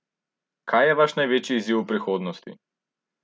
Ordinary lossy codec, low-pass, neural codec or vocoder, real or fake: none; none; none; real